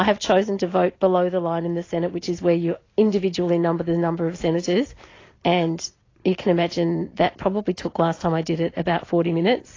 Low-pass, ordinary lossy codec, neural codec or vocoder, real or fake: 7.2 kHz; AAC, 32 kbps; none; real